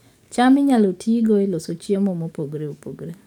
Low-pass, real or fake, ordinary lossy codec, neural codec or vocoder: 19.8 kHz; fake; none; codec, 44.1 kHz, 7.8 kbps, DAC